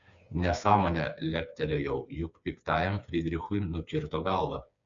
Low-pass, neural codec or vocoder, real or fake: 7.2 kHz; codec, 16 kHz, 4 kbps, FreqCodec, smaller model; fake